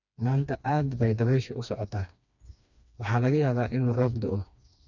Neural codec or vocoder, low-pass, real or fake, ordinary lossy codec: codec, 16 kHz, 2 kbps, FreqCodec, smaller model; 7.2 kHz; fake; none